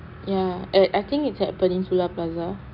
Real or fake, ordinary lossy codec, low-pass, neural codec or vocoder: real; none; 5.4 kHz; none